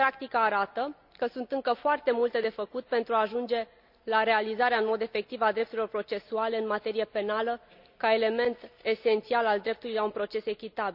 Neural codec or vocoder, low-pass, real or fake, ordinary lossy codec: none; 5.4 kHz; real; none